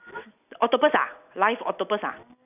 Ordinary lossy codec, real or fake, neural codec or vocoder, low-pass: none; real; none; 3.6 kHz